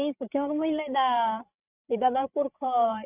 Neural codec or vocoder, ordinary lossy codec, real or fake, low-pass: codec, 16 kHz, 16 kbps, FreqCodec, larger model; none; fake; 3.6 kHz